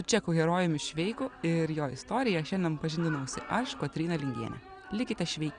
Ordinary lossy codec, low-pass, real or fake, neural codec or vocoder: Opus, 64 kbps; 9.9 kHz; real; none